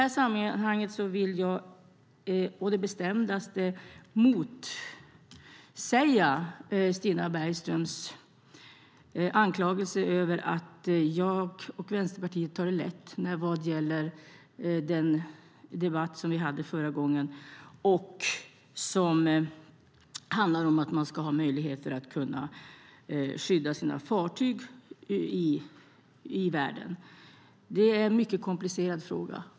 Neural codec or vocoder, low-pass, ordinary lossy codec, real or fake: none; none; none; real